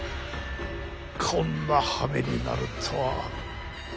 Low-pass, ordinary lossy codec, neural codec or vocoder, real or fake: none; none; none; real